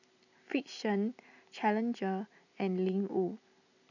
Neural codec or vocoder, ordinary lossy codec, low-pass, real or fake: none; none; 7.2 kHz; real